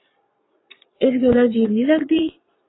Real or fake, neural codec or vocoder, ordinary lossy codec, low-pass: fake; vocoder, 22.05 kHz, 80 mel bands, Vocos; AAC, 16 kbps; 7.2 kHz